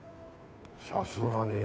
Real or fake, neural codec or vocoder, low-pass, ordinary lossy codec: fake; codec, 16 kHz, 2 kbps, FunCodec, trained on Chinese and English, 25 frames a second; none; none